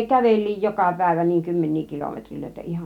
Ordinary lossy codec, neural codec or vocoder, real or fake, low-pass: none; none; real; 19.8 kHz